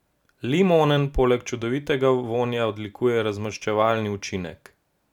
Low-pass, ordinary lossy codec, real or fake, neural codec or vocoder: 19.8 kHz; none; real; none